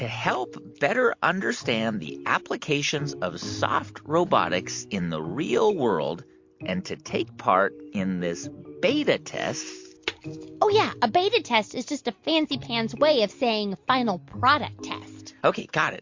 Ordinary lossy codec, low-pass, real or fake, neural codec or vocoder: MP3, 48 kbps; 7.2 kHz; real; none